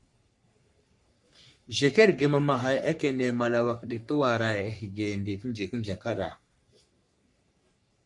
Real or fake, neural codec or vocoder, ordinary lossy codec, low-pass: fake; codec, 44.1 kHz, 3.4 kbps, Pupu-Codec; MP3, 96 kbps; 10.8 kHz